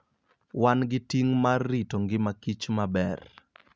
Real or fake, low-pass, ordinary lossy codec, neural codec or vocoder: real; none; none; none